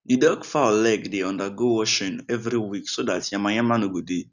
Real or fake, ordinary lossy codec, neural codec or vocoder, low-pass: real; none; none; 7.2 kHz